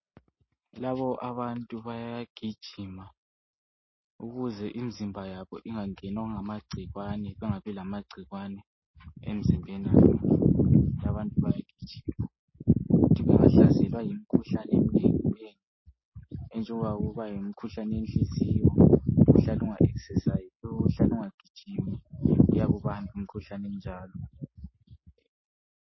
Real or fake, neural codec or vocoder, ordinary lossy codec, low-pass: real; none; MP3, 24 kbps; 7.2 kHz